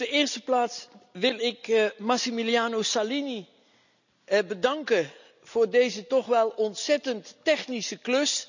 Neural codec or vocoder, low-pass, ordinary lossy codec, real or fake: none; 7.2 kHz; none; real